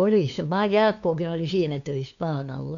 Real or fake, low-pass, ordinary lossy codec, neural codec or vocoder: fake; 7.2 kHz; none; codec, 16 kHz, 2 kbps, FunCodec, trained on LibriTTS, 25 frames a second